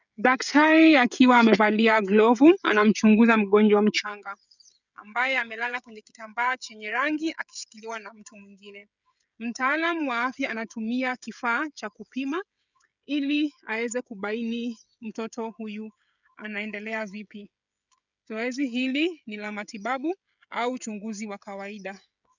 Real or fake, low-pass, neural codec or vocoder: fake; 7.2 kHz; codec, 16 kHz, 16 kbps, FreqCodec, smaller model